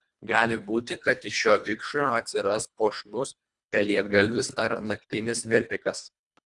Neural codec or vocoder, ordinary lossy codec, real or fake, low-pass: codec, 24 kHz, 1.5 kbps, HILCodec; Opus, 64 kbps; fake; 10.8 kHz